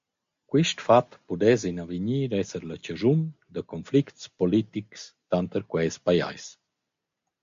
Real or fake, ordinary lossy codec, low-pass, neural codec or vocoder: real; MP3, 48 kbps; 7.2 kHz; none